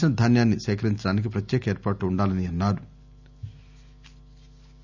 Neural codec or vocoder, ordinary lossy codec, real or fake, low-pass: none; none; real; 7.2 kHz